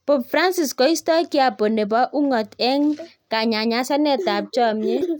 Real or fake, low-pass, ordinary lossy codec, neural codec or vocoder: real; 19.8 kHz; none; none